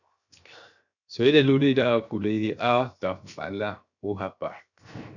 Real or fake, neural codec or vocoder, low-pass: fake; codec, 16 kHz, 0.7 kbps, FocalCodec; 7.2 kHz